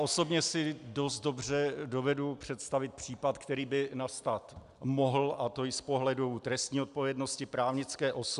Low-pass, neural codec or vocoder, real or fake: 10.8 kHz; none; real